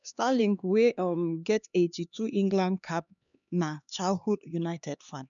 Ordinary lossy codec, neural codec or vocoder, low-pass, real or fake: none; codec, 16 kHz, 2 kbps, X-Codec, HuBERT features, trained on LibriSpeech; 7.2 kHz; fake